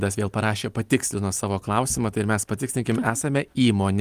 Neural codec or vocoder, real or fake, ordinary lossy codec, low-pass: none; real; Opus, 32 kbps; 14.4 kHz